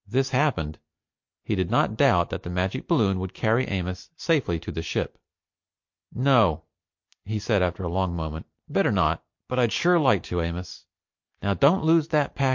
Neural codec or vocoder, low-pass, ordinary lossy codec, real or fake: none; 7.2 kHz; MP3, 48 kbps; real